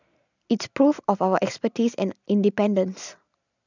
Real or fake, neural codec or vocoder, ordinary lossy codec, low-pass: real; none; none; 7.2 kHz